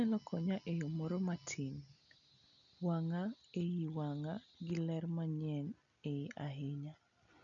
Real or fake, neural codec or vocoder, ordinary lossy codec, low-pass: real; none; none; 7.2 kHz